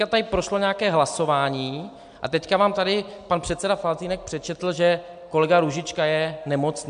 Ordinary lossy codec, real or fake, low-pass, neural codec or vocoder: MP3, 64 kbps; real; 9.9 kHz; none